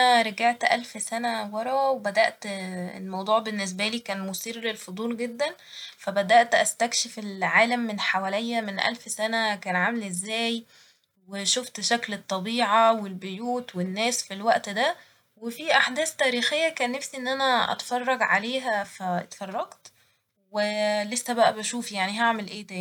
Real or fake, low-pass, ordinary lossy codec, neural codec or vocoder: real; 19.8 kHz; none; none